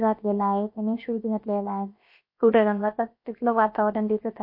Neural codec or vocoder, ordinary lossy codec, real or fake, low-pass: codec, 16 kHz, about 1 kbps, DyCAST, with the encoder's durations; MP3, 32 kbps; fake; 5.4 kHz